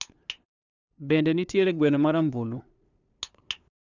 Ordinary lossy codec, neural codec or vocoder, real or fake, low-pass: none; codec, 16 kHz, 2 kbps, FunCodec, trained on LibriTTS, 25 frames a second; fake; 7.2 kHz